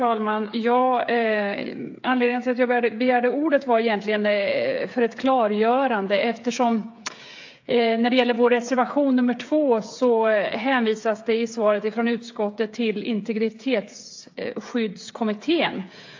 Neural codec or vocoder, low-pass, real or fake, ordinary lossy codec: codec, 16 kHz, 8 kbps, FreqCodec, smaller model; 7.2 kHz; fake; AAC, 48 kbps